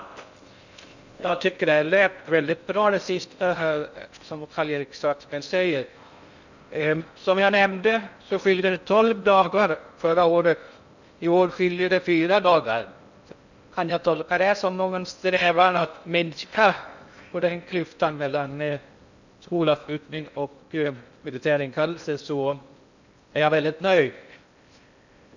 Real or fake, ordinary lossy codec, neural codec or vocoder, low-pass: fake; none; codec, 16 kHz in and 24 kHz out, 0.6 kbps, FocalCodec, streaming, 4096 codes; 7.2 kHz